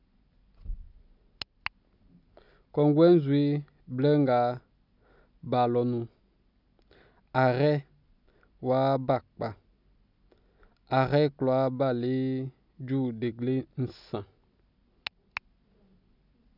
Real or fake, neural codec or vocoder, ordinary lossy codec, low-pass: real; none; none; 5.4 kHz